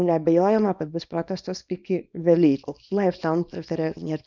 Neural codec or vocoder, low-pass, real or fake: codec, 24 kHz, 0.9 kbps, WavTokenizer, small release; 7.2 kHz; fake